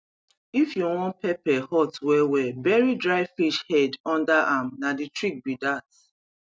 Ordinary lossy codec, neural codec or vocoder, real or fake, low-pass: none; none; real; none